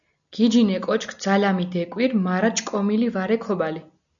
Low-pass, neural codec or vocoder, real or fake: 7.2 kHz; none; real